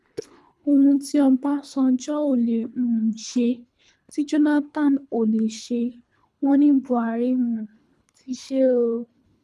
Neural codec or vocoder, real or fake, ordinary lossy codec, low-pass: codec, 24 kHz, 3 kbps, HILCodec; fake; none; none